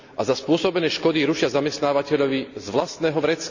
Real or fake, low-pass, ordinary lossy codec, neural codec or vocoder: real; 7.2 kHz; none; none